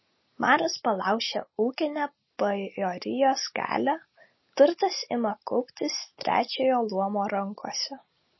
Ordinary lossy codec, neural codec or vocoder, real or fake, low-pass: MP3, 24 kbps; none; real; 7.2 kHz